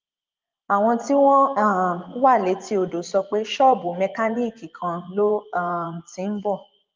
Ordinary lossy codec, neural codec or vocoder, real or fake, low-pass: Opus, 24 kbps; vocoder, 24 kHz, 100 mel bands, Vocos; fake; 7.2 kHz